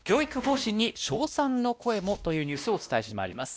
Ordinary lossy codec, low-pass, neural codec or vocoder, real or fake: none; none; codec, 16 kHz, 1 kbps, X-Codec, WavLM features, trained on Multilingual LibriSpeech; fake